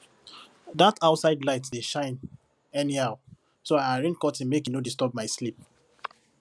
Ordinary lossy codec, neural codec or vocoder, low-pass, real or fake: none; none; none; real